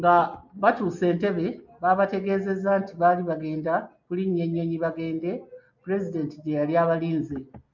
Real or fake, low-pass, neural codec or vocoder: real; 7.2 kHz; none